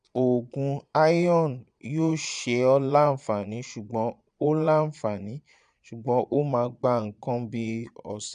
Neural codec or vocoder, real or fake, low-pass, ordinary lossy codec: vocoder, 22.05 kHz, 80 mel bands, Vocos; fake; 9.9 kHz; AAC, 96 kbps